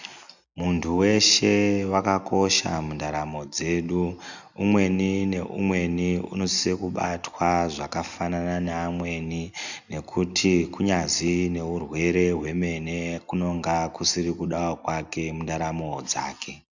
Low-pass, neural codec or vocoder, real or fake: 7.2 kHz; none; real